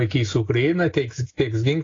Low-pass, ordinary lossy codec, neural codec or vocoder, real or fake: 7.2 kHz; AAC, 32 kbps; none; real